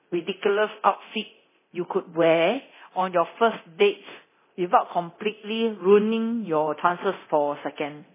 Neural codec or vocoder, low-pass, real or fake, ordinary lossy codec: codec, 24 kHz, 0.9 kbps, DualCodec; 3.6 kHz; fake; MP3, 16 kbps